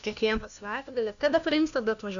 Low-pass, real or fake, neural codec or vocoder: 7.2 kHz; fake; codec, 16 kHz, 1 kbps, FunCodec, trained on LibriTTS, 50 frames a second